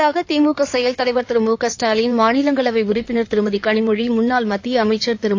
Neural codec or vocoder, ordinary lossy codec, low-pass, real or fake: codec, 16 kHz in and 24 kHz out, 2.2 kbps, FireRedTTS-2 codec; AAC, 48 kbps; 7.2 kHz; fake